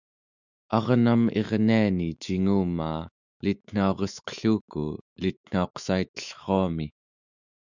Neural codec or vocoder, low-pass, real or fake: autoencoder, 48 kHz, 128 numbers a frame, DAC-VAE, trained on Japanese speech; 7.2 kHz; fake